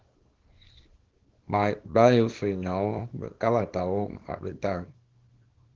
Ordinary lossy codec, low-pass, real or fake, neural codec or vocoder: Opus, 16 kbps; 7.2 kHz; fake; codec, 24 kHz, 0.9 kbps, WavTokenizer, small release